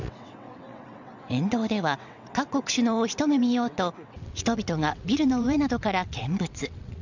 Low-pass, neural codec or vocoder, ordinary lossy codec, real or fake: 7.2 kHz; vocoder, 22.05 kHz, 80 mel bands, WaveNeXt; none; fake